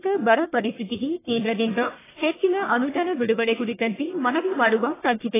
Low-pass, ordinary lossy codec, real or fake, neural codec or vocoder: 3.6 kHz; AAC, 16 kbps; fake; codec, 44.1 kHz, 1.7 kbps, Pupu-Codec